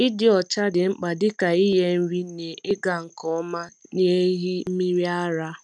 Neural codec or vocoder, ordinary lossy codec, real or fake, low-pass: none; none; real; none